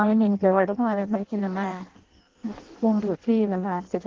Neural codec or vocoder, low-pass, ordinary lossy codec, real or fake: codec, 16 kHz in and 24 kHz out, 0.6 kbps, FireRedTTS-2 codec; 7.2 kHz; Opus, 16 kbps; fake